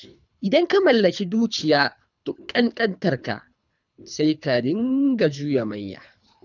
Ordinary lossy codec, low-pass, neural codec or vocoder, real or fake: none; 7.2 kHz; codec, 24 kHz, 3 kbps, HILCodec; fake